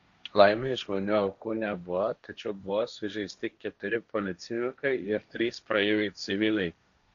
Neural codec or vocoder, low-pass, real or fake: codec, 16 kHz, 1.1 kbps, Voila-Tokenizer; 7.2 kHz; fake